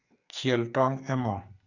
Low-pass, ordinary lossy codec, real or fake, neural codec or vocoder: 7.2 kHz; none; fake; codec, 44.1 kHz, 2.6 kbps, SNAC